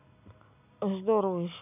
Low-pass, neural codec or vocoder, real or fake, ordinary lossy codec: 3.6 kHz; none; real; none